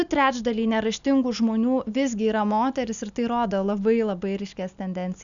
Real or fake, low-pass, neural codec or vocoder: real; 7.2 kHz; none